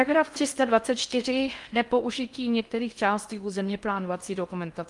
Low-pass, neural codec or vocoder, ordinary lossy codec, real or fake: 10.8 kHz; codec, 16 kHz in and 24 kHz out, 0.6 kbps, FocalCodec, streaming, 4096 codes; Opus, 32 kbps; fake